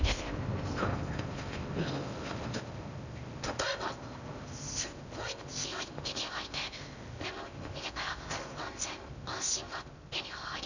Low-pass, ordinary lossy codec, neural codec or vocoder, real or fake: 7.2 kHz; none; codec, 16 kHz in and 24 kHz out, 0.6 kbps, FocalCodec, streaming, 4096 codes; fake